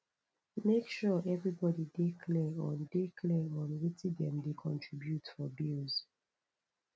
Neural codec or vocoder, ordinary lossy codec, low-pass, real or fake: none; none; none; real